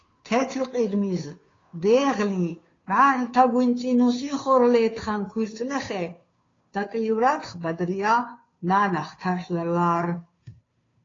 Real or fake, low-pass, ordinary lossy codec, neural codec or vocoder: fake; 7.2 kHz; AAC, 32 kbps; codec, 16 kHz, 2 kbps, FunCodec, trained on Chinese and English, 25 frames a second